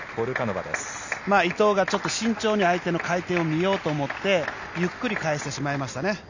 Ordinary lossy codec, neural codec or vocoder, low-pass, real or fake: none; none; 7.2 kHz; real